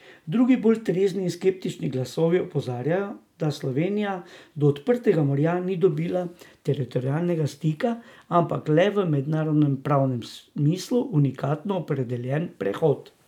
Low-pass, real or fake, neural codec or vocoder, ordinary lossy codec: 19.8 kHz; real; none; none